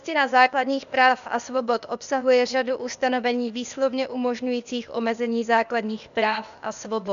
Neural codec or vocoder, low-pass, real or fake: codec, 16 kHz, 0.8 kbps, ZipCodec; 7.2 kHz; fake